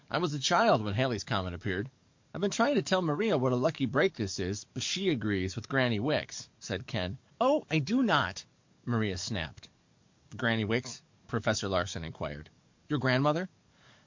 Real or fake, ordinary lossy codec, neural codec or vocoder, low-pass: fake; MP3, 48 kbps; codec, 44.1 kHz, 7.8 kbps, DAC; 7.2 kHz